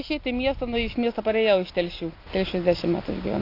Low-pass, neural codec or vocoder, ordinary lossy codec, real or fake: 5.4 kHz; none; AAC, 32 kbps; real